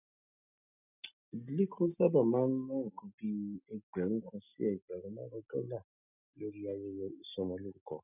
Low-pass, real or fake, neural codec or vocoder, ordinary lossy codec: 3.6 kHz; real; none; none